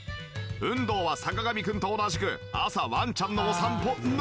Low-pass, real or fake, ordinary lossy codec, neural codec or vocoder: none; real; none; none